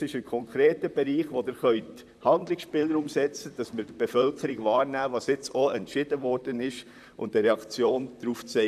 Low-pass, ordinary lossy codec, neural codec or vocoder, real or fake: 14.4 kHz; none; vocoder, 44.1 kHz, 128 mel bands, Pupu-Vocoder; fake